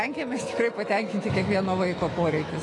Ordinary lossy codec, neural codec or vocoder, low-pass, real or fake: AAC, 32 kbps; autoencoder, 48 kHz, 128 numbers a frame, DAC-VAE, trained on Japanese speech; 10.8 kHz; fake